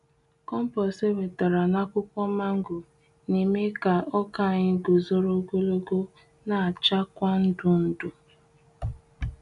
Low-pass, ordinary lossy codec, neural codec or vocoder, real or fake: 10.8 kHz; none; none; real